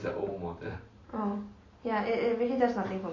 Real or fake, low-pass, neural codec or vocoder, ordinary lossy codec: real; 7.2 kHz; none; MP3, 32 kbps